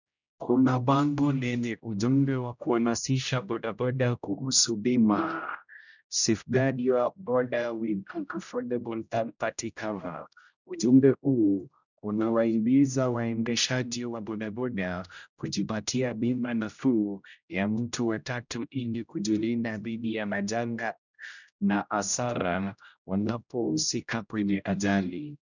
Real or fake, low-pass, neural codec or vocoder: fake; 7.2 kHz; codec, 16 kHz, 0.5 kbps, X-Codec, HuBERT features, trained on general audio